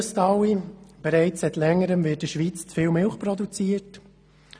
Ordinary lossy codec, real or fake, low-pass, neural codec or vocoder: none; real; none; none